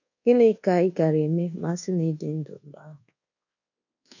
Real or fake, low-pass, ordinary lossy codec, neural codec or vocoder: fake; 7.2 kHz; none; codec, 24 kHz, 1.2 kbps, DualCodec